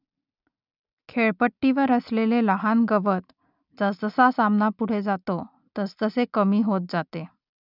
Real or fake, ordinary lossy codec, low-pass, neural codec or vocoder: real; none; 5.4 kHz; none